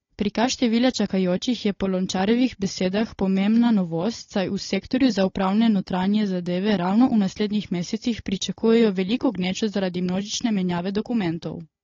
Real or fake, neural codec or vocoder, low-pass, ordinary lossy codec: fake; codec, 16 kHz, 16 kbps, FunCodec, trained on Chinese and English, 50 frames a second; 7.2 kHz; AAC, 32 kbps